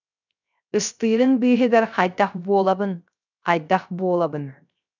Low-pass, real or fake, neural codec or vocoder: 7.2 kHz; fake; codec, 16 kHz, 0.3 kbps, FocalCodec